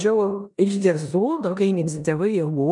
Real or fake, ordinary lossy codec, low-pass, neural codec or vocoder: fake; MP3, 96 kbps; 10.8 kHz; codec, 16 kHz in and 24 kHz out, 0.9 kbps, LongCat-Audio-Codec, four codebook decoder